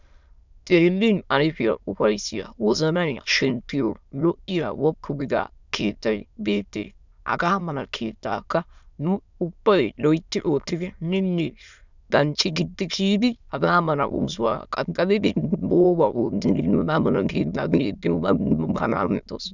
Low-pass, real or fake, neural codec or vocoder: 7.2 kHz; fake; autoencoder, 22.05 kHz, a latent of 192 numbers a frame, VITS, trained on many speakers